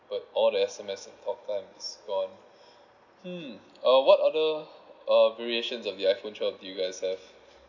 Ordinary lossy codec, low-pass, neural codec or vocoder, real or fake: none; 7.2 kHz; none; real